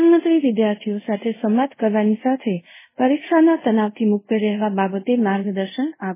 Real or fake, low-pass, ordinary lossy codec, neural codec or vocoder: fake; 3.6 kHz; MP3, 16 kbps; codec, 24 kHz, 0.5 kbps, DualCodec